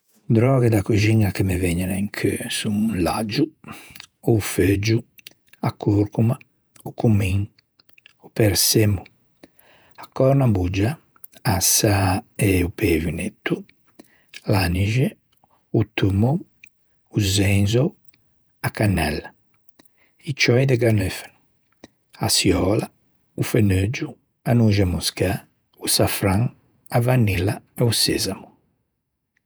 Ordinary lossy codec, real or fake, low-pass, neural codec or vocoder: none; fake; none; vocoder, 48 kHz, 128 mel bands, Vocos